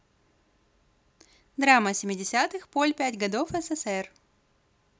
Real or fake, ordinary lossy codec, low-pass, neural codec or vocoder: real; none; none; none